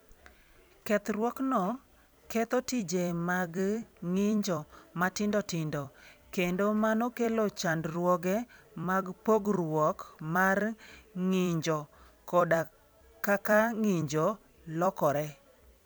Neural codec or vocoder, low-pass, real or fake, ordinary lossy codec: vocoder, 44.1 kHz, 128 mel bands every 256 samples, BigVGAN v2; none; fake; none